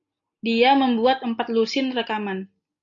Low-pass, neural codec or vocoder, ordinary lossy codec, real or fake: 7.2 kHz; none; AAC, 64 kbps; real